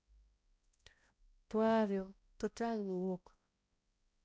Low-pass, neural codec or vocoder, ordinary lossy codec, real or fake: none; codec, 16 kHz, 0.5 kbps, X-Codec, HuBERT features, trained on balanced general audio; none; fake